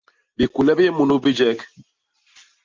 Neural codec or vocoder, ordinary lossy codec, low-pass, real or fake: none; Opus, 24 kbps; 7.2 kHz; real